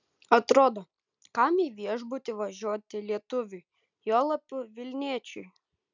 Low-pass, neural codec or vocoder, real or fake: 7.2 kHz; none; real